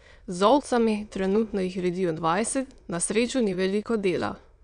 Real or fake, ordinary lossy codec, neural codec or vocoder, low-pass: fake; none; autoencoder, 22.05 kHz, a latent of 192 numbers a frame, VITS, trained on many speakers; 9.9 kHz